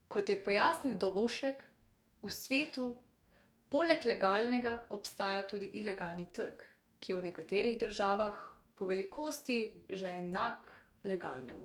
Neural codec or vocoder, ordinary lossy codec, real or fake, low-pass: codec, 44.1 kHz, 2.6 kbps, DAC; none; fake; 19.8 kHz